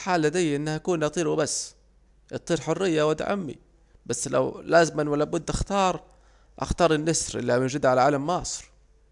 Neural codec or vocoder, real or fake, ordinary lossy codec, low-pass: none; real; none; 10.8 kHz